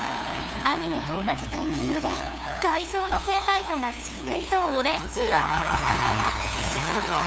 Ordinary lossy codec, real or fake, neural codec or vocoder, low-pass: none; fake; codec, 16 kHz, 2 kbps, FunCodec, trained on LibriTTS, 25 frames a second; none